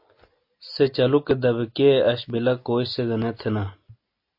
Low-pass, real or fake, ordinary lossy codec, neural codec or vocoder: 5.4 kHz; real; MP3, 32 kbps; none